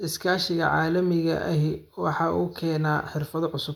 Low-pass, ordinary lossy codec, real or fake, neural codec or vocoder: 19.8 kHz; none; real; none